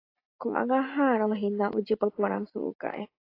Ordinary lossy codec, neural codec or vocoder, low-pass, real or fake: AAC, 32 kbps; none; 5.4 kHz; real